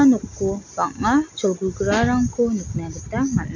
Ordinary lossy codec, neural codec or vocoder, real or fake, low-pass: none; none; real; 7.2 kHz